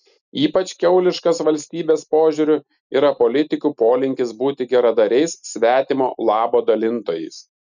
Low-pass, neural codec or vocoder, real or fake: 7.2 kHz; none; real